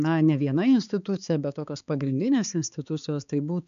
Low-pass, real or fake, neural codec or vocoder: 7.2 kHz; fake; codec, 16 kHz, 4 kbps, X-Codec, HuBERT features, trained on balanced general audio